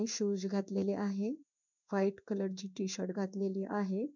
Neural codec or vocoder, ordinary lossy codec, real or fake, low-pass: codec, 16 kHz in and 24 kHz out, 1 kbps, XY-Tokenizer; none; fake; 7.2 kHz